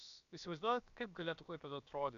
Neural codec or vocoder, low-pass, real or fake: codec, 16 kHz, about 1 kbps, DyCAST, with the encoder's durations; 7.2 kHz; fake